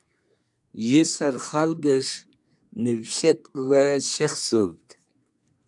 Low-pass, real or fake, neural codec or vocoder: 10.8 kHz; fake; codec, 24 kHz, 1 kbps, SNAC